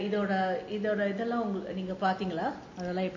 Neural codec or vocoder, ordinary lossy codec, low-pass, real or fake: none; MP3, 32 kbps; 7.2 kHz; real